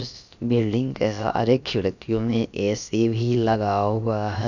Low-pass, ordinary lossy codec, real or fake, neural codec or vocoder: 7.2 kHz; none; fake; codec, 16 kHz, about 1 kbps, DyCAST, with the encoder's durations